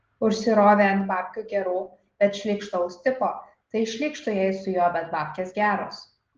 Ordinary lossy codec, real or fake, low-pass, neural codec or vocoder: Opus, 16 kbps; real; 7.2 kHz; none